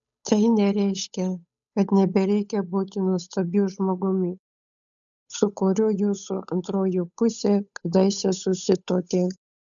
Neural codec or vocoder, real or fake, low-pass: codec, 16 kHz, 8 kbps, FunCodec, trained on Chinese and English, 25 frames a second; fake; 7.2 kHz